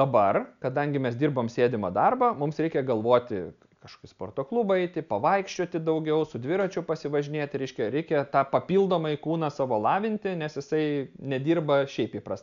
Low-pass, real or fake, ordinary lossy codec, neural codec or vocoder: 7.2 kHz; real; MP3, 96 kbps; none